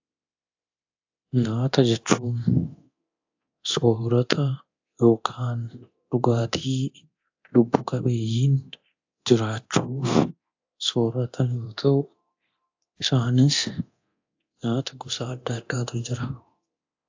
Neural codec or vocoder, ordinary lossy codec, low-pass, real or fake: codec, 24 kHz, 0.9 kbps, DualCodec; AAC, 48 kbps; 7.2 kHz; fake